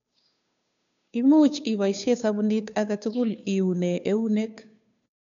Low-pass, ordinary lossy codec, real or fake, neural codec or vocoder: 7.2 kHz; none; fake; codec, 16 kHz, 2 kbps, FunCodec, trained on Chinese and English, 25 frames a second